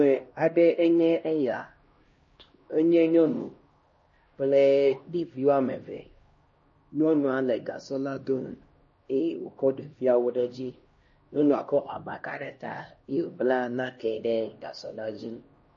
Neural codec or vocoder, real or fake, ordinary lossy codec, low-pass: codec, 16 kHz, 1 kbps, X-Codec, HuBERT features, trained on LibriSpeech; fake; MP3, 32 kbps; 7.2 kHz